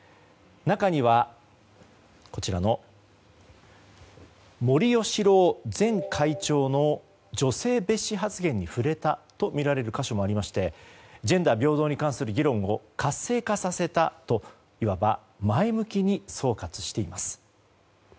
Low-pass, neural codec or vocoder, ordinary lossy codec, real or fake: none; none; none; real